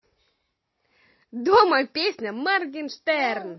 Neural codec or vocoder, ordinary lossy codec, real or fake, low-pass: none; MP3, 24 kbps; real; 7.2 kHz